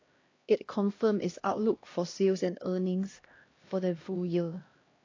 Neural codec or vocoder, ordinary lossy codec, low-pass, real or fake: codec, 16 kHz, 1 kbps, X-Codec, HuBERT features, trained on LibriSpeech; AAC, 32 kbps; 7.2 kHz; fake